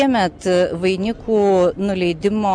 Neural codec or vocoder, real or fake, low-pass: none; real; 9.9 kHz